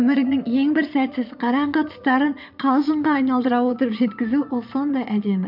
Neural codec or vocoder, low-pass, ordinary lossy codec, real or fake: vocoder, 22.05 kHz, 80 mel bands, Vocos; 5.4 kHz; none; fake